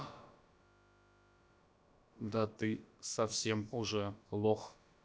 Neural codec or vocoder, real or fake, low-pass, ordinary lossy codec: codec, 16 kHz, about 1 kbps, DyCAST, with the encoder's durations; fake; none; none